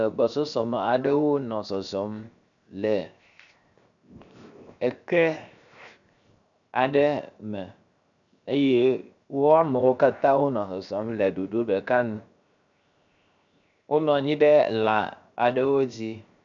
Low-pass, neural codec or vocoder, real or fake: 7.2 kHz; codec, 16 kHz, 0.7 kbps, FocalCodec; fake